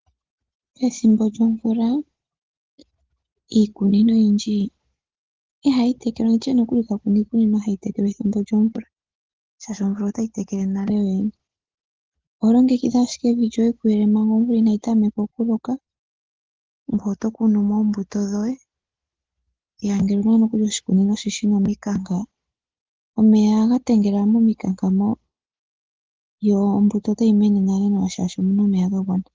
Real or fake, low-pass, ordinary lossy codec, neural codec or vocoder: real; 7.2 kHz; Opus, 16 kbps; none